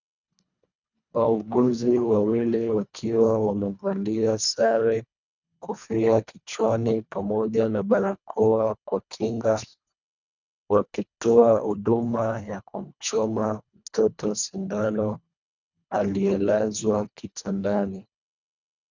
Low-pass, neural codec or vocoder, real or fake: 7.2 kHz; codec, 24 kHz, 1.5 kbps, HILCodec; fake